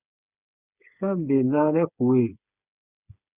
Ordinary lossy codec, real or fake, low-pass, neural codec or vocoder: Opus, 64 kbps; fake; 3.6 kHz; codec, 16 kHz, 8 kbps, FreqCodec, smaller model